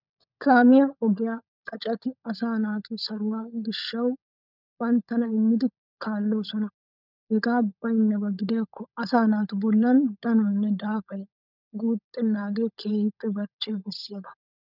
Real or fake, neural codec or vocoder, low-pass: fake; codec, 16 kHz, 16 kbps, FunCodec, trained on LibriTTS, 50 frames a second; 5.4 kHz